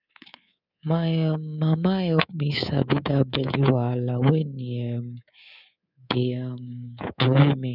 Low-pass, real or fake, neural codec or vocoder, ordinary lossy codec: 5.4 kHz; fake; codec, 24 kHz, 3.1 kbps, DualCodec; none